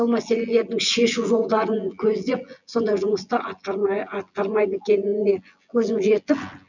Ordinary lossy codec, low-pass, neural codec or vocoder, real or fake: none; 7.2 kHz; vocoder, 24 kHz, 100 mel bands, Vocos; fake